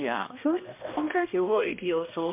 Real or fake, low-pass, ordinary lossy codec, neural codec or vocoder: fake; 3.6 kHz; MP3, 32 kbps; codec, 16 kHz, 1 kbps, X-Codec, HuBERT features, trained on balanced general audio